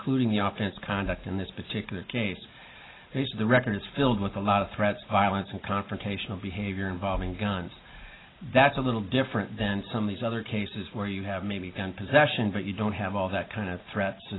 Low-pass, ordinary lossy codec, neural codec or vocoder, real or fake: 7.2 kHz; AAC, 16 kbps; none; real